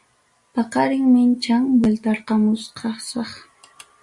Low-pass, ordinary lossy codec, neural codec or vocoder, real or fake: 10.8 kHz; Opus, 64 kbps; none; real